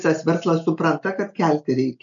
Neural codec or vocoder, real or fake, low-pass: none; real; 7.2 kHz